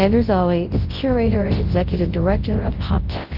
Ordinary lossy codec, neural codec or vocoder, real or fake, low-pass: Opus, 16 kbps; codec, 24 kHz, 0.9 kbps, WavTokenizer, large speech release; fake; 5.4 kHz